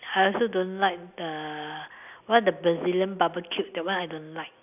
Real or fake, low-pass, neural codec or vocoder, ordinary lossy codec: real; 3.6 kHz; none; none